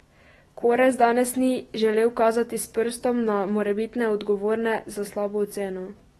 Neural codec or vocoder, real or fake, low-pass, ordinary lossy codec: autoencoder, 48 kHz, 128 numbers a frame, DAC-VAE, trained on Japanese speech; fake; 19.8 kHz; AAC, 32 kbps